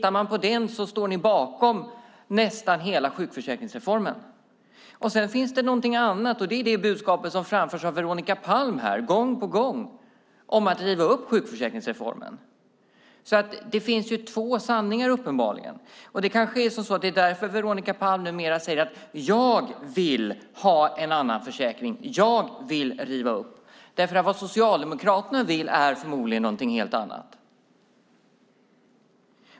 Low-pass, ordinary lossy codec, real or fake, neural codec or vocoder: none; none; real; none